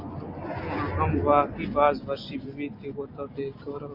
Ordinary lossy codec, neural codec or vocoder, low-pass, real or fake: MP3, 32 kbps; none; 5.4 kHz; real